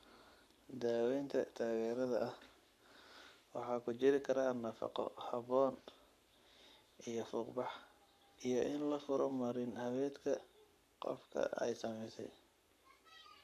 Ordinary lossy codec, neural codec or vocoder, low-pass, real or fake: none; codec, 44.1 kHz, 7.8 kbps, Pupu-Codec; 14.4 kHz; fake